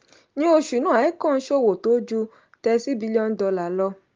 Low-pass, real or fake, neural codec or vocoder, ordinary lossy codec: 7.2 kHz; real; none; Opus, 32 kbps